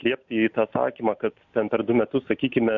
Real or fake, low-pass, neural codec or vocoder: real; 7.2 kHz; none